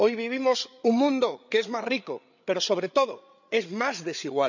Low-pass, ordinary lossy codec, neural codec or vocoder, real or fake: 7.2 kHz; none; codec, 16 kHz, 8 kbps, FreqCodec, larger model; fake